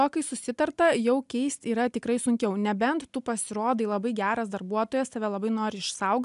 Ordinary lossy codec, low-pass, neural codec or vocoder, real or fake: MP3, 96 kbps; 10.8 kHz; none; real